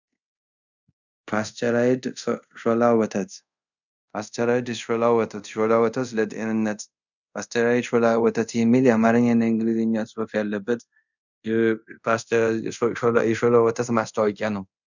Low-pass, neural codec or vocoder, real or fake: 7.2 kHz; codec, 24 kHz, 0.5 kbps, DualCodec; fake